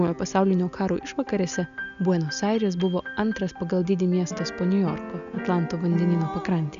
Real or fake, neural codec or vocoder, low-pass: real; none; 7.2 kHz